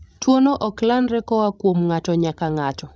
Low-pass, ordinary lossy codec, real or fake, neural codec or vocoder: none; none; fake; codec, 16 kHz, 16 kbps, FreqCodec, larger model